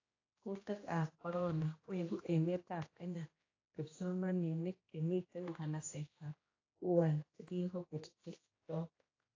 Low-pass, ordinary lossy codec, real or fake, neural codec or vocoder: 7.2 kHz; AAC, 32 kbps; fake; codec, 16 kHz, 1 kbps, X-Codec, HuBERT features, trained on general audio